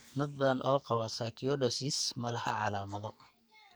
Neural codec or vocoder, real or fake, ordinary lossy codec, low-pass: codec, 44.1 kHz, 2.6 kbps, SNAC; fake; none; none